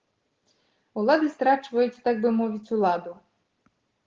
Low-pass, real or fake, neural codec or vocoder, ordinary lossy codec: 7.2 kHz; real; none; Opus, 16 kbps